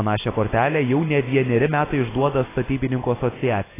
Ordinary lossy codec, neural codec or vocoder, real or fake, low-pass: AAC, 16 kbps; none; real; 3.6 kHz